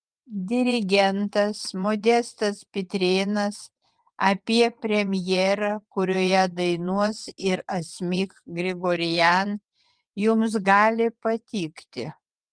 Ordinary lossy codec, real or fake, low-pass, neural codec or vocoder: Opus, 32 kbps; fake; 9.9 kHz; vocoder, 22.05 kHz, 80 mel bands, WaveNeXt